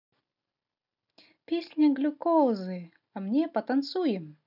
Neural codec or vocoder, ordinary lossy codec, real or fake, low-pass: none; none; real; 5.4 kHz